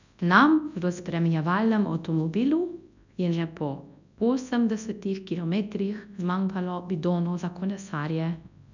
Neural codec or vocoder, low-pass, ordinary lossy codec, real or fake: codec, 24 kHz, 0.9 kbps, WavTokenizer, large speech release; 7.2 kHz; none; fake